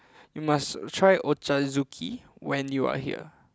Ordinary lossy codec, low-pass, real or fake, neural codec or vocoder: none; none; real; none